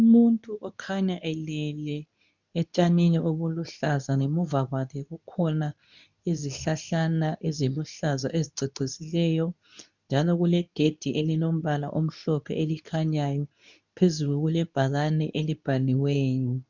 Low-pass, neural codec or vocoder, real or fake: 7.2 kHz; codec, 24 kHz, 0.9 kbps, WavTokenizer, medium speech release version 2; fake